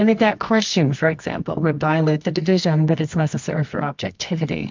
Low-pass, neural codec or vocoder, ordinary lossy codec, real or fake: 7.2 kHz; codec, 24 kHz, 0.9 kbps, WavTokenizer, medium music audio release; MP3, 64 kbps; fake